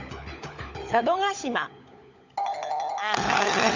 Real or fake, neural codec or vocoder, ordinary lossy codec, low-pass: fake; codec, 16 kHz, 16 kbps, FunCodec, trained on LibriTTS, 50 frames a second; none; 7.2 kHz